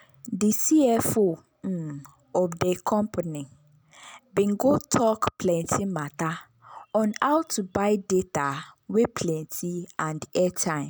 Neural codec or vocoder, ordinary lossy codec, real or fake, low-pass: none; none; real; none